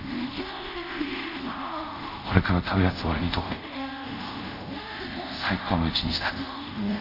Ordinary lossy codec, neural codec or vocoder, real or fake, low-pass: none; codec, 24 kHz, 0.5 kbps, DualCodec; fake; 5.4 kHz